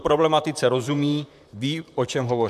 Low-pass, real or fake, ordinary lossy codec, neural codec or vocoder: 14.4 kHz; fake; MP3, 96 kbps; vocoder, 44.1 kHz, 128 mel bands, Pupu-Vocoder